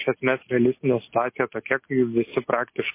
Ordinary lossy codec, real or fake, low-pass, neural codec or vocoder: MP3, 24 kbps; real; 3.6 kHz; none